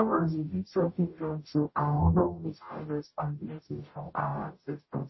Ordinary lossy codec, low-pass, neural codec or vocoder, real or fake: MP3, 24 kbps; 7.2 kHz; codec, 44.1 kHz, 0.9 kbps, DAC; fake